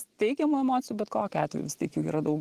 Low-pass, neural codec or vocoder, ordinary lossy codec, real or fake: 14.4 kHz; none; Opus, 16 kbps; real